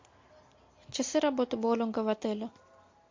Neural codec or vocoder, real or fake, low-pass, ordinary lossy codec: none; real; 7.2 kHz; MP3, 48 kbps